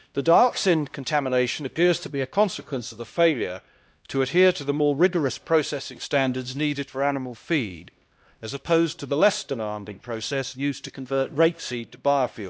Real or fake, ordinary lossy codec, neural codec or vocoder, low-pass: fake; none; codec, 16 kHz, 1 kbps, X-Codec, HuBERT features, trained on LibriSpeech; none